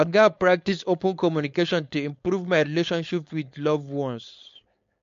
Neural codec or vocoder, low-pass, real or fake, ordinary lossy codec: codec, 16 kHz, 4.8 kbps, FACodec; 7.2 kHz; fake; MP3, 48 kbps